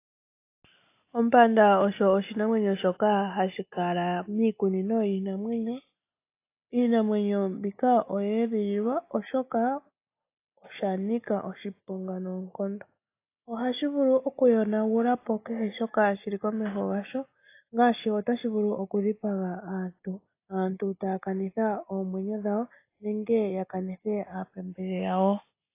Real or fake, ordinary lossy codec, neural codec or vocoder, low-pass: real; AAC, 24 kbps; none; 3.6 kHz